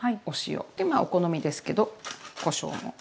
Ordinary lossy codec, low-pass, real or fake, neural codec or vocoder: none; none; real; none